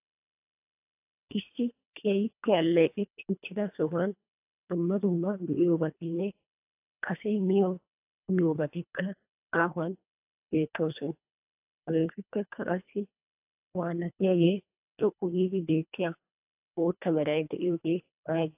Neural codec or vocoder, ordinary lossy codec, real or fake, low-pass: codec, 24 kHz, 1.5 kbps, HILCodec; AAC, 32 kbps; fake; 3.6 kHz